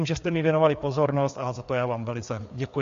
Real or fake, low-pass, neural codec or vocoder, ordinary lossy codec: fake; 7.2 kHz; codec, 16 kHz, 4 kbps, FreqCodec, larger model; MP3, 48 kbps